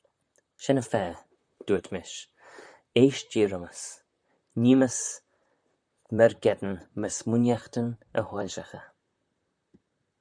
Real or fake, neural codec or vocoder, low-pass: fake; vocoder, 44.1 kHz, 128 mel bands, Pupu-Vocoder; 9.9 kHz